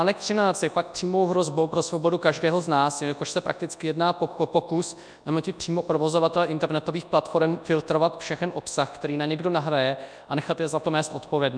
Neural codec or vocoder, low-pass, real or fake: codec, 24 kHz, 0.9 kbps, WavTokenizer, large speech release; 9.9 kHz; fake